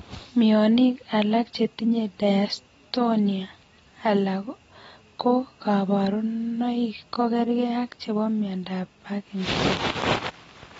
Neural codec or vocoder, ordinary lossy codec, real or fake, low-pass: none; AAC, 24 kbps; real; 19.8 kHz